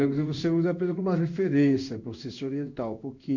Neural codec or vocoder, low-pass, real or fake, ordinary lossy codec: codec, 16 kHz in and 24 kHz out, 1 kbps, XY-Tokenizer; 7.2 kHz; fake; none